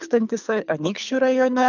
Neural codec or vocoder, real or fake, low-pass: codec, 24 kHz, 3 kbps, HILCodec; fake; 7.2 kHz